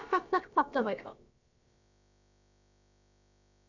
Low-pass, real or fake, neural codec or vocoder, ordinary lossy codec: 7.2 kHz; fake; codec, 16 kHz, about 1 kbps, DyCAST, with the encoder's durations; none